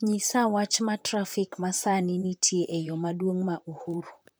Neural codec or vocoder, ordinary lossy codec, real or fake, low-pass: vocoder, 44.1 kHz, 128 mel bands, Pupu-Vocoder; none; fake; none